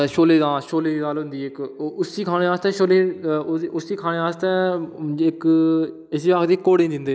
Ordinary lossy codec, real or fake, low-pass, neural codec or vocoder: none; real; none; none